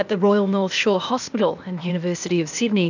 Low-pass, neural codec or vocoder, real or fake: 7.2 kHz; codec, 16 kHz, 0.8 kbps, ZipCodec; fake